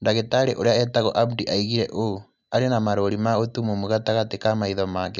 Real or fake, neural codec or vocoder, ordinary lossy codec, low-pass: real; none; none; 7.2 kHz